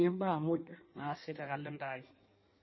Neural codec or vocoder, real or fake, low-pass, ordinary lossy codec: codec, 16 kHz in and 24 kHz out, 1.1 kbps, FireRedTTS-2 codec; fake; 7.2 kHz; MP3, 24 kbps